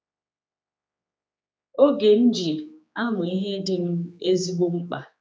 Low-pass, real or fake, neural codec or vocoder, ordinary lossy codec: none; fake; codec, 16 kHz, 4 kbps, X-Codec, HuBERT features, trained on general audio; none